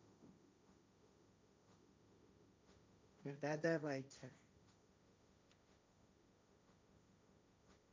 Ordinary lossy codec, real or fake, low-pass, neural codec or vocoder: none; fake; none; codec, 16 kHz, 1.1 kbps, Voila-Tokenizer